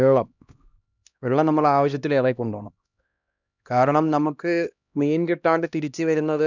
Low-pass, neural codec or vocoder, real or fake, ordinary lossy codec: 7.2 kHz; codec, 16 kHz, 1 kbps, X-Codec, HuBERT features, trained on LibriSpeech; fake; none